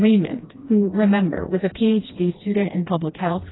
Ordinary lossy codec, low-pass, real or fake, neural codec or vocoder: AAC, 16 kbps; 7.2 kHz; fake; codec, 16 kHz, 1 kbps, FreqCodec, smaller model